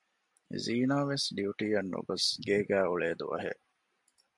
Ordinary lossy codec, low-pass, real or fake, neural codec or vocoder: MP3, 64 kbps; 10.8 kHz; real; none